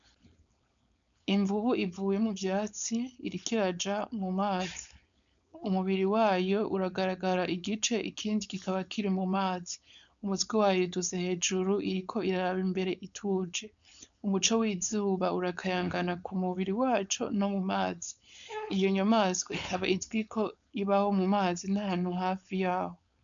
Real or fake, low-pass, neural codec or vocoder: fake; 7.2 kHz; codec, 16 kHz, 4.8 kbps, FACodec